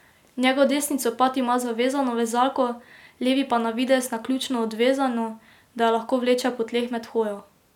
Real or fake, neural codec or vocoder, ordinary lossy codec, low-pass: real; none; none; 19.8 kHz